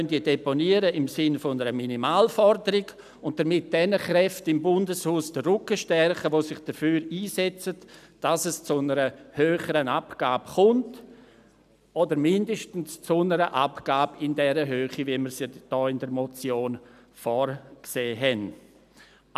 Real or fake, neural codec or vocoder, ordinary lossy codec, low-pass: real; none; none; 14.4 kHz